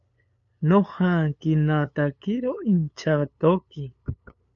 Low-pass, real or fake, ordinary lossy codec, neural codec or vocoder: 7.2 kHz; fake; MP3, 48 kbps; codec, 16 kHz, 8 kbps, FunCodec, trained on LibriTTS, 25 frames a second